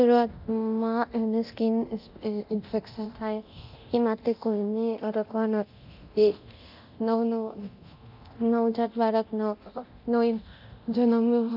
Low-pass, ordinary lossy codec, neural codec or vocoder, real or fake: 5.4 kHz; none; codec, 24 kHz, 0.9 kbps, DualCodec; fake